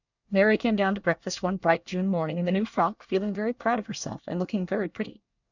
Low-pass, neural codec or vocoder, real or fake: 7.2 kHz; codec, 24 kHz, 1 kbps, SNAC; fake